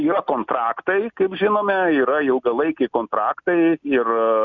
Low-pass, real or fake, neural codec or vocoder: 7.2 kHz; real; none